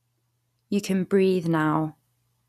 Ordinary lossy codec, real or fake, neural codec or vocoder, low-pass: none; real; none; 14.4 kHz